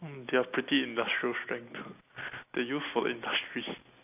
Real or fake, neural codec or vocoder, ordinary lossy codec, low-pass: real; none; AAC, 32 kbps; 3.6 kHz